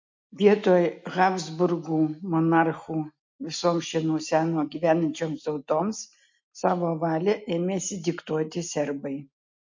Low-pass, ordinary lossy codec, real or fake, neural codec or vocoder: 7.2 kHz; MP3, 48 kbps; real; none